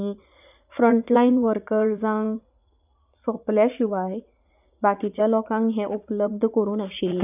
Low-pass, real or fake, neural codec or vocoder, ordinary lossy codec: 3.6 kHz; fake; vocoder, 44.1 kHz, 80 mel bands, Vocos; none